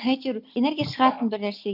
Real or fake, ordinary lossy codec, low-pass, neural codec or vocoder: real; none; 5.4 kHz; none